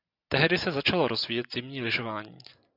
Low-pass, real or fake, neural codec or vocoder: 5.4 kHz; real; none